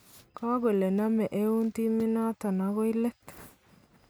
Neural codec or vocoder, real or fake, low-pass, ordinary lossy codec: none; real; none; none